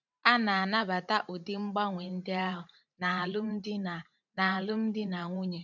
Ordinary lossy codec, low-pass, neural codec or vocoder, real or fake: none; 7.2 kHz; vocoder, 44.1 kHz, 128 mel bands every 512 samples, BigVGAN v2; fake